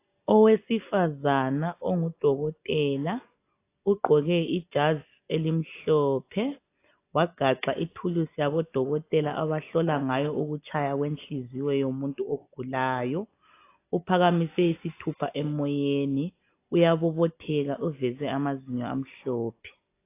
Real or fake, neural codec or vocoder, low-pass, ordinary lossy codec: real; none; 3.6 kHz; AAC, 24 kbps